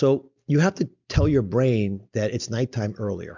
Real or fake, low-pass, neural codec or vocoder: real; 7.2 kHz; none